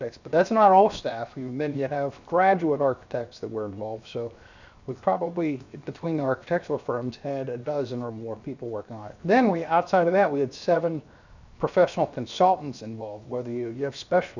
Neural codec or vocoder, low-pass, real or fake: codec, 16 kHz, 0.7 kbps, FocalCodec; 7.2 kHz; fake